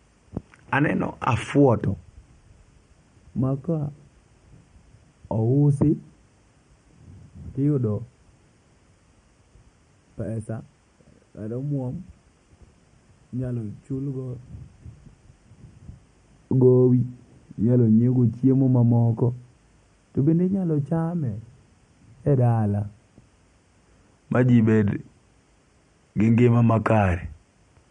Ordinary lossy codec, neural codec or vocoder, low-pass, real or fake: MP3, 48 kbps; none; 9.9 kHz; real